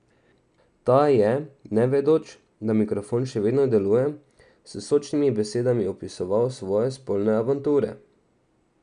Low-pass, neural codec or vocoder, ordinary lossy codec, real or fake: 9.9 kHz; none; none; real